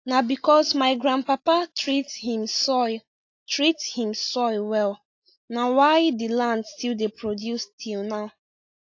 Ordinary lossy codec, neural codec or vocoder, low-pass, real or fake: AAC, 48 kbps; none; 7.2 kHz; real